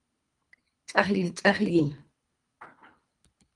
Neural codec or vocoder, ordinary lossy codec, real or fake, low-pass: codec, 24 kHz, 3 kbps, HILCodec; Opus, 32 kbps; fake; 10.8 kHz